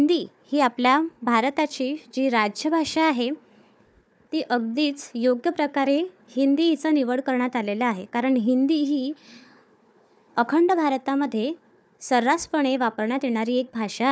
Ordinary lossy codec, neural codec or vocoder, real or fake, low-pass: none; codec, 16 kHz, 4 kbps, FunCodec, trained on Chinese and English, 50 frames a second; fake; none